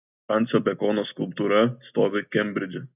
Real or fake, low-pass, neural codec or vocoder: real; 3.6 kHz; none